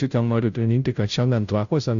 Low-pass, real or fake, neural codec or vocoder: 7.2 kHz; fake; codec, 16 kHz, 0.5 kbps, FunCodec, trained on Chinese and English, 25 frames a second